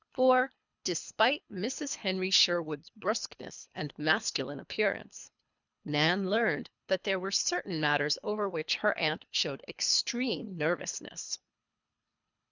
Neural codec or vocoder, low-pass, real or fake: codec, 24 kHz, 3 kbps, HILCodec; 7.2 kHz; fake